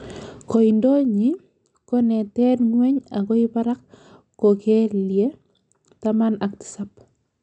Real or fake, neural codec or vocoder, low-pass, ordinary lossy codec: real; none; 9.9 kHz; none